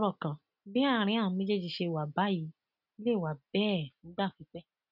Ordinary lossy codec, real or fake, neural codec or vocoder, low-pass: none; real; none; 5.4 kHz